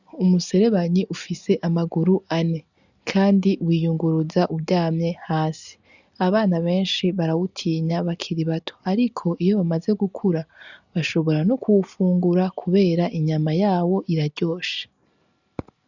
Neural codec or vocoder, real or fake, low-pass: none; real; 7.2 kHz